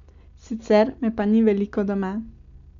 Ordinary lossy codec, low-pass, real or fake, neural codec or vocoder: MP3, 64 kbps; 7.2 kHz; real; none